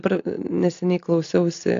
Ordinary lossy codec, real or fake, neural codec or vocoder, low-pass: AAC, 48 kbps; real; none; 7.2 kHz